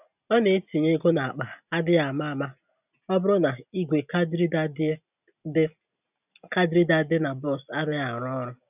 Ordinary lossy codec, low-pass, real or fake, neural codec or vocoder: none; 3.6 kHz; real; none